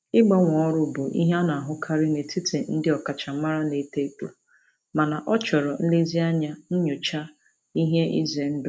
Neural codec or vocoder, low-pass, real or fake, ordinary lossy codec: none; none; real; none